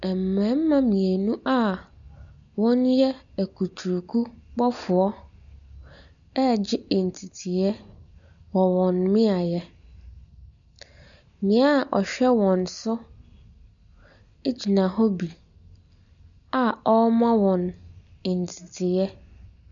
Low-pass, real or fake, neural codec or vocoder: 7.2 kHz; real; none